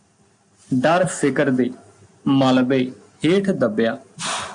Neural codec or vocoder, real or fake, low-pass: none; real; 9.9 kHz